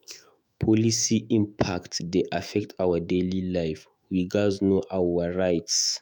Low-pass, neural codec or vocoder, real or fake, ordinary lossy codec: none; autoencoder, 48 kHz, 128 numbers a frame, DAC-VAE, trained on Japanese speech; fake; none